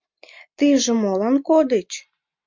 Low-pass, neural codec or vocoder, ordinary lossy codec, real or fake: 7.2 kHz; none; MP3, 64 kbps; real